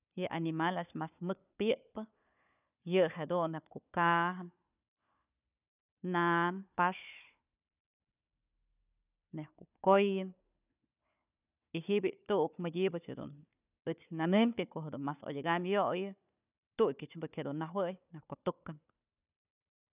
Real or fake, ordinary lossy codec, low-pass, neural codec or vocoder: fake; none; 3.6 kHz; codec, 16 kHz, 4 kbps, FunCodec, trained on Chinese and English, 50 frames a second